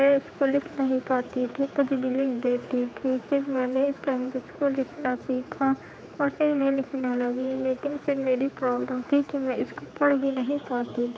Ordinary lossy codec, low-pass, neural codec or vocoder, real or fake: none; none; codec, 16 kHz, 4 kbps, X-Codec, HuBERT features, trained on general audio; fake